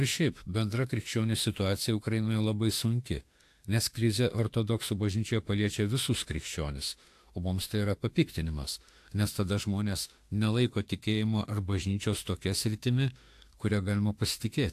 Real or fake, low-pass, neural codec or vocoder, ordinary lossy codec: fake; 14.4 kHz; autoencoder, 48 kHz, 32 numbers a frame, DAC-VAE, trained on Japanese speech; AAC, 64 kbps